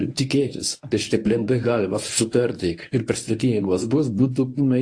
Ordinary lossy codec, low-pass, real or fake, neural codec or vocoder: AAC, 48 kbps; 9.9 kHz; fake; codec, 24 kHz, 0.9 kbps, WavTokenizer, medium speech release version 1